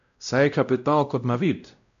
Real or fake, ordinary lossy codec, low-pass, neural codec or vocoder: fake; none; 7.2 kHz; codec, 16 kHz, 0.5 kbps, X-Codec, WavLM features, trained on Multilingual LibriSpeech